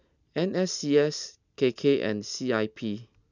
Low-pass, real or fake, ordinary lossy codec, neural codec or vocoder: 7.2 kHz; real; none; none